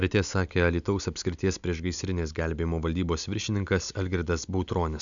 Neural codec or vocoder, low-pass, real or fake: none; 7.2 kHz; real